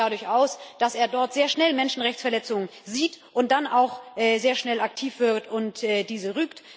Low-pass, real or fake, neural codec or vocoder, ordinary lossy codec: none; real; none; none